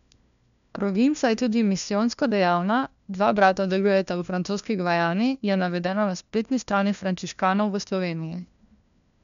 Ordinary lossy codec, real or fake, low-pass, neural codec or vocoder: none; fake; 7.2 kHz; codec, 16 kHz, 1 kbps, FunCodec, trained on LibriTTS, 50 frames a second